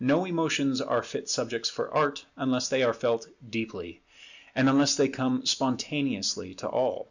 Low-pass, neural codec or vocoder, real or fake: 7.2 kHz; none; real